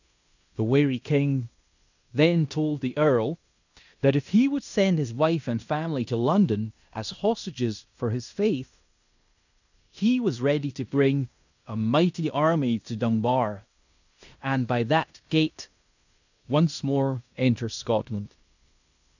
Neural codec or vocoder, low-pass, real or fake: codec, 16 kHz in and 24 kHz out, 0.9 kbps, LongCat-Audio-Codec, fine tuned four codebook decoder; 7.2 kHz; fake